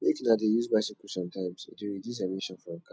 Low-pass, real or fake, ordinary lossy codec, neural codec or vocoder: none; real; none; none